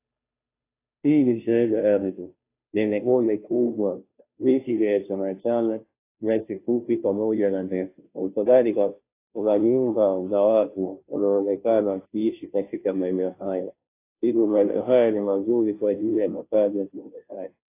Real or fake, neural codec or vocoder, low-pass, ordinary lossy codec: fake; codec, 16 kHz, 0.5 kbps, FunCodec, trained on Chinese and English, 25 frames a second; 3.6 kHz; AAC, 24 kbps